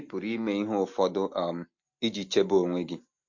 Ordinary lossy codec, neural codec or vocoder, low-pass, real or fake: MP3, 48 kbps; none; 7.2 kHz; real